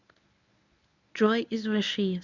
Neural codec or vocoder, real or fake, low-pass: codec, 24 kHz, 0.9 kbps, WavTokenizer, medium speech release version 1; fake; 7.2 kHz